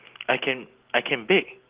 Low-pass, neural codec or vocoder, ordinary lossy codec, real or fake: 3.6 kHz; none; Opus, 16 kbps; real